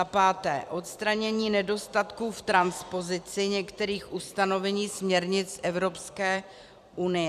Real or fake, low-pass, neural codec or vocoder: fake; 14.4 kHz; vocoder, 44.1 kHz, 128 mel bands every 256 samples, BigVGAN v2